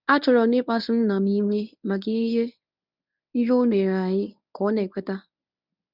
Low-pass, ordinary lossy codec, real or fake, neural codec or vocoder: 5.4 kHz; none; fake; codec, 24 kHz, 0.9 kbps, WavTokenizer, medium speech release version 1